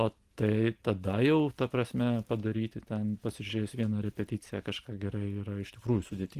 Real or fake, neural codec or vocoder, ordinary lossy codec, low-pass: real; none; Opus, 24 kbps; 14.4 kHz